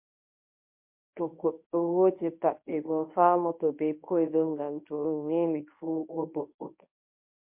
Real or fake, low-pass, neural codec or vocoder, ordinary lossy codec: fake; 3.6 kHz; codec, 24 kHz, 0.9 kbps, WavTokenizer, medium speech release version 1; MP3, 32 kbps